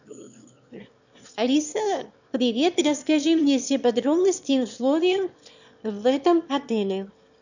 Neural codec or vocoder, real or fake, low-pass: autoencoder, 22.05 kHz, a latent of 192 numbers a frame, VITS, trained on one speaker; fake; 7.2 kHz